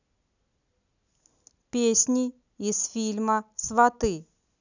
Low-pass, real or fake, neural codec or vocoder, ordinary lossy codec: 7.2 kHz; real; none; none